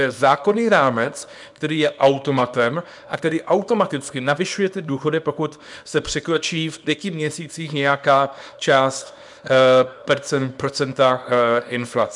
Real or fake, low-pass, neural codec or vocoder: fake; 10.8 kHz; codec, 24 kHz, 0.9 kbps, WavTokenizer, small release